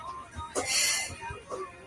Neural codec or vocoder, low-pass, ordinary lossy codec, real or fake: none; 10.8 kHz; Opus, 24 kbps; real